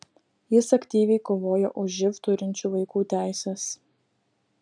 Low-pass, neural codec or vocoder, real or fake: 9.9 kHz; none; real